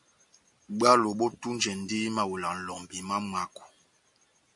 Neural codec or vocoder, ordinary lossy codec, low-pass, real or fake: none; MP3, 64 kbps; 10.8 kHz; real